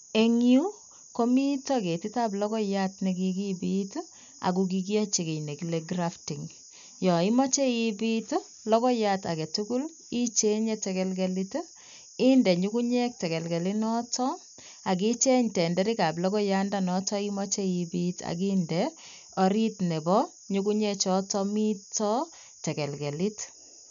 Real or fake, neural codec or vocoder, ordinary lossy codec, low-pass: real; none; none; 7.2 kHz